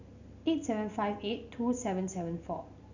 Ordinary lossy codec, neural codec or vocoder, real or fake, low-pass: none; none; real; 7.2 kHz